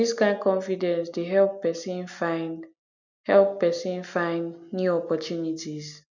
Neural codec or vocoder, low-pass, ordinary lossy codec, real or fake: none; 7.2 kHz; none; real